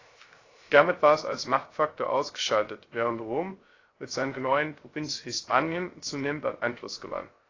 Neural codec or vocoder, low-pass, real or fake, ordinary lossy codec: codec, 16 kHz, 0.3 kbps, FocalCodec; 7.2 kHz; fake; AAC, 32 kbps